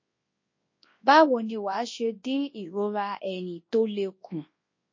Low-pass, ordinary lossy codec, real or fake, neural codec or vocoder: 7.2 kHz; MP3, 32 kbps; fake; codec, 24 kHz, 0.9 kbps, WavTokenizer, large speech release